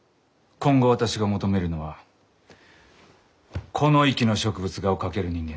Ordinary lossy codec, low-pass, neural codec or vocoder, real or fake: none; none; none; real